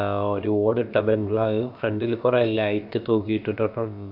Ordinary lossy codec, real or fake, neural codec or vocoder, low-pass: none; fake; codec, 16 kHz, about 1 kbps, DyCAST, with the encoder's durations; 5.4 kHz